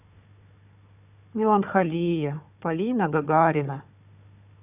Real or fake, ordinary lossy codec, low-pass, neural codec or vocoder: fake; none; 3.6 kHz; codec, 16 kHz, 4 kbps, FunCodec, trained on Chinese and English, 50 frames a second